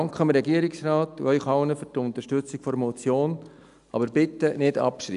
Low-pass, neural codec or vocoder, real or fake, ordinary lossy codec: 10.8 kHz; none; real; none